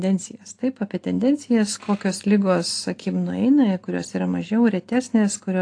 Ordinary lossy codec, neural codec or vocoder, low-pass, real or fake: AAC, 48 kbps; none; 9.9 kHz; real